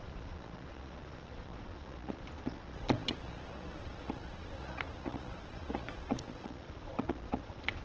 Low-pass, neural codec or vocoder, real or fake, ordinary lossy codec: 7.2 kHz; vocoder, 22.05 kHz, 80 mel bands, Vocos; fake; Opus, 16 kbps